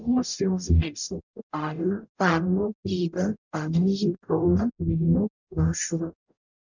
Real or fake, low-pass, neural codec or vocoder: fake; 7.2 kHz; codec, 44.1 kHz, 0.9 kbps, DAC